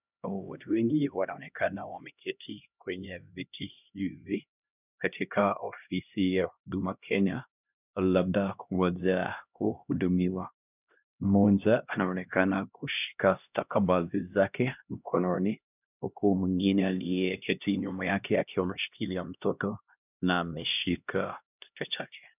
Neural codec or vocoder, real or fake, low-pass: codec, 16 kHz, 1 kbps, X-Codec, HuBERT features, trained on LibriSpeech; fake; 3.6 kHz